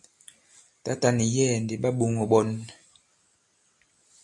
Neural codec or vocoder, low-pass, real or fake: none; 10.8 kHz; real